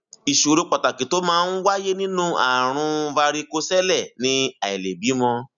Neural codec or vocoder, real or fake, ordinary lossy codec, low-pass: none; real; none; 7.2 kHz